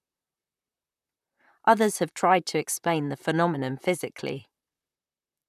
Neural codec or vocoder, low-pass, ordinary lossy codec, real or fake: vocoder, 44.1 kHz, 128 mel bands, Pupu-Vocoder; 14.4 kHz; none; fake